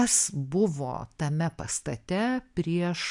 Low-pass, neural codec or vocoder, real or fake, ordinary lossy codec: 10.8 kHz; none; real; MP3, 96 kbps